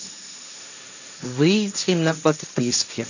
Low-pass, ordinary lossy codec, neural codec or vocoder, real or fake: 7.2 kHz; none; codec, 16 kHz, 1.1 kbps, Voila-Tokenizer; fake